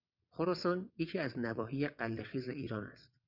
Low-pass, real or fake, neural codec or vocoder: 5.4 kHz; fake; vocoder, 22.05 kHz, 80 mel bands, WaveNeXt